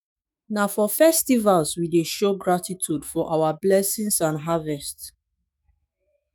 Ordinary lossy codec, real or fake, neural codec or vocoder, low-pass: none; fake; autoencoder, 48 kHz, 128 numbers a frame, DAC-VAE, trained on Japanese speech; none